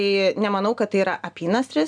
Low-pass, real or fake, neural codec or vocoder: 9.9 kHz; real; none